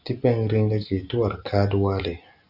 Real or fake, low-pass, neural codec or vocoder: real; 5.4 kHz; none